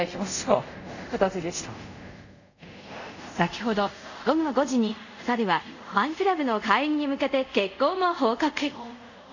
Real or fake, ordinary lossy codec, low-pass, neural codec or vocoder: fake; none; 7.2 kHz; codec, 24 kHz, 0.5 kbps, DualCodec